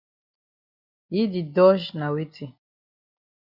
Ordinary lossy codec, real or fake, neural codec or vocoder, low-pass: Opus, 64 kbps; real; none; 5.4 kHz